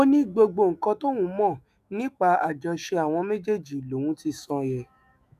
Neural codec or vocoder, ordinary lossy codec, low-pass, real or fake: autoencoder, 48 kHz, 128 numbers a frame, DAC-VAE, trained on Japanese speech; none; 14.4 kHz; fake